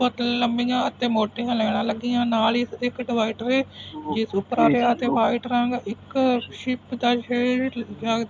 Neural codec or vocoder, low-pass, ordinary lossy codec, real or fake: none; none; none; real